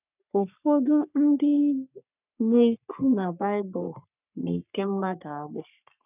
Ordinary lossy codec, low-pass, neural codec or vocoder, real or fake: none; 3.6 kHz; codec, 44.1 kHz, 3.4 kbps, Pupu-Codec; fake